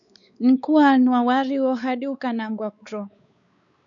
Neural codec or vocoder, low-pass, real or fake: codec, 16 kHz, 4 kbps, X-Codec, WavLM features, trained on Multilingual LibriSpeech; 7.2 kHz; fake